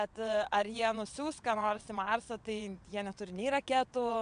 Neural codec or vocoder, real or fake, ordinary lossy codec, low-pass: vocoder, 22.05 kHz, 80 mel bands, WaveNeXt; fake; AAC, 96 kbps; 9.9 kHz